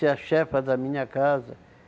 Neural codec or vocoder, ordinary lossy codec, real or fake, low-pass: none; none; real; none